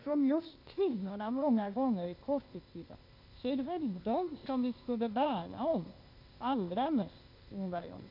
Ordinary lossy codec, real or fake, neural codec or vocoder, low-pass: none; fake; codec, 16 kHz, 0.8 kbps, ZipCodec; 5.4 kHz